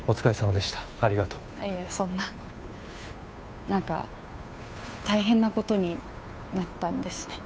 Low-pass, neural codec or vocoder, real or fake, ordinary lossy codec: none; codec, 16 kHz, 2 kbps, FunCodec, trained on Chinese and English, 25 frames a second; fake; none